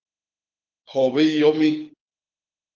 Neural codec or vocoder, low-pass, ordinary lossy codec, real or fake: codec, 24 kHz, 6 kbps, HILCodec; 7.2 kHz; Opus, 24 kbps; fake